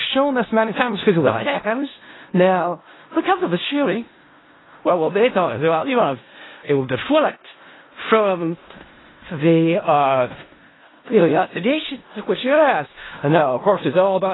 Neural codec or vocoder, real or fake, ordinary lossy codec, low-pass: codec, 16 kHz in and 24 kHz out, 0.4 kbps, LongCat-Audio-Codec, four codebook decoder; fake; AAC, 16 kbps; 7.2 kHz